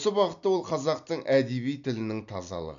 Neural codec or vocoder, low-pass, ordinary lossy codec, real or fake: none; 7.2 kHz; none; real